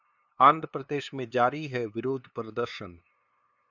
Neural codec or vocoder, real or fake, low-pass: codec, 16 kHz, 2 kbps, FunCodec, trained on LibriTTS, 25 frames a second; fake; 7.2 kHz